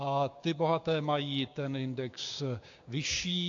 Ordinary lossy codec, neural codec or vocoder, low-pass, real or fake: AAC, 48 kbps; codec, 16 kHz, 6 kbps, DAC; 7.2 kHz; fake